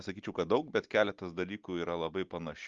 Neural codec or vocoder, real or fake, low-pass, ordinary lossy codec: none; real; 7.2 kHz; Opus, 32 kbps